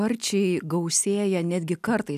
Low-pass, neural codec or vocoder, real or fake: 14.4 kHz; none; real